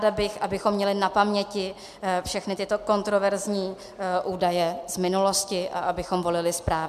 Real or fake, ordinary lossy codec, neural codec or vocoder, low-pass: real; MP3, 96 kbps; none; 14.4 kHz